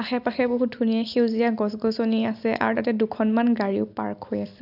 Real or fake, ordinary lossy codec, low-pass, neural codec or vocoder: real; none; 5.4 kHz; none